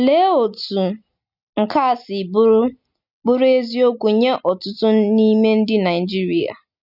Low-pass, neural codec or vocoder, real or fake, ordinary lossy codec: 5.4 kHz; none; real; none